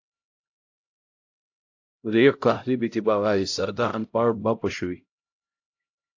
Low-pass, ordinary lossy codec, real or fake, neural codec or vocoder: 7.2 kHz; AAC, 48 kbps; fake; codec, 16 kHz, 0.5 kbps, X-Codec, HuBERT features, trained on LibriSpeech